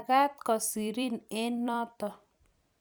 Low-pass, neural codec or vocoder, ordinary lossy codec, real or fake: none; vocoder, 44.1 kHz, 128 mel bands every 256 samples, BigVGAN v2; none; fake